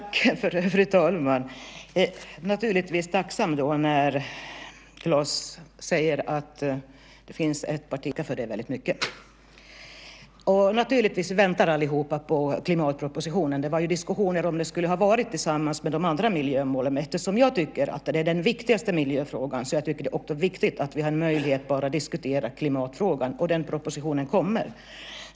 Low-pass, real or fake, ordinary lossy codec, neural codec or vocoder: none; real; none; none